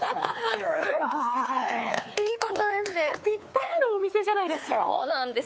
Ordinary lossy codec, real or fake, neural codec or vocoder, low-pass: none; fake; codec, 16 kHz, 4 kbps, X-Codec, WavLM features, trained on Multilingual LibriSpeech; none